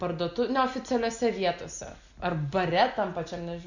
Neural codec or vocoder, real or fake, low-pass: none; real; 7.2 kHz